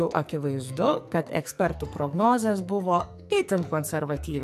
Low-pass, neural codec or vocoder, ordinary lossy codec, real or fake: 14.4 kHz; codec, 44.1 kHz, 2.6 kbps, SNAC; MP3, 96 kbps; fake